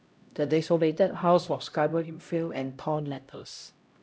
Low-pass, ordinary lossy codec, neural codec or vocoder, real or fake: none; none; codec, 16 kHz, 0.5 kbps, X-Codec, HuBERT features, trained on LibriSpeech; fake